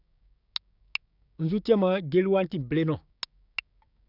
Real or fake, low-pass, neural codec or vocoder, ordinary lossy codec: fake; 5.4 kHz; codec, 16 kHz, 6 kbps, DAC; none